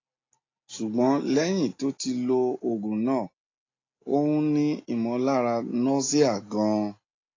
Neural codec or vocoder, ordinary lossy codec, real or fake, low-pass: none; AAC, 32 kbps; real; 7.2 kHz